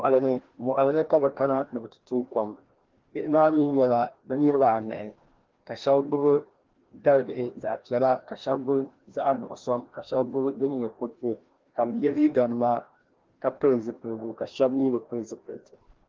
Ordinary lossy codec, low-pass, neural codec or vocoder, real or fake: Opus, 16 kbps; 7.2 kHz; codec, 16 kHz, 1 kbps, FreqCodec, larger model; fake